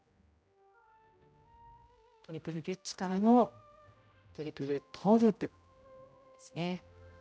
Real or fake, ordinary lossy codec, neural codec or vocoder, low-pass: fake; none; codec, 16 kHz, 0.5 kbps, X-Codec, HuBERT features, trained on general audio; none